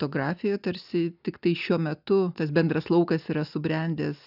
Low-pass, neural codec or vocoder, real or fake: 5.4 kHz; none; real